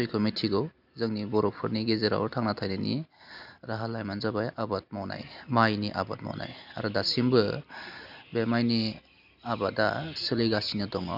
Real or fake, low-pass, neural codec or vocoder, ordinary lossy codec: real; 5.4 kHz; none; none